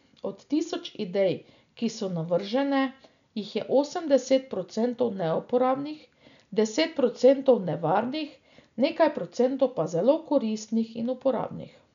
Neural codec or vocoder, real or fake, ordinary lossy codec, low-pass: none; real; none; 7.2 kHz